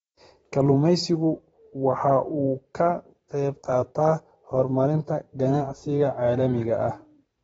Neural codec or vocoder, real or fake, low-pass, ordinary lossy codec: vocoder, 44.1 kHz, 128 mel bands every 512 samples, BigVGAN v2; fake; 19.8 kHz; AAC, 24 kbps